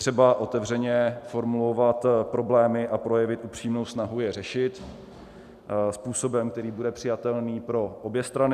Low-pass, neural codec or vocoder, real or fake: 14.4 kHz; none; real